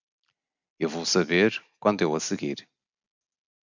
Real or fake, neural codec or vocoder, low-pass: real; none; 7.2 kHz